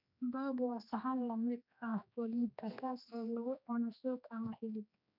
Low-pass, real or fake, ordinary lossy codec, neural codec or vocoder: 5.4 kHz; fake; none; codec, 16 kHz, 2 kbps, X-Codec, HuBERT features, trained on general audio